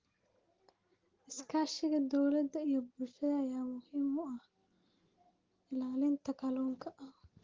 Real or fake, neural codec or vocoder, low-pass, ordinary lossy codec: real; none; 7.2 kHz; Opus, 16 kbps